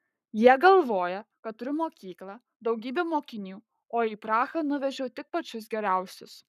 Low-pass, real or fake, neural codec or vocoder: 14.4 kHz; fake; codec, 44.1 kHz, 7.8 kbps, Pupu-Codec